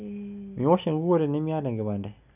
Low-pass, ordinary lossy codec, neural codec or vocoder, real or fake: 3.6 kHz; none; none; real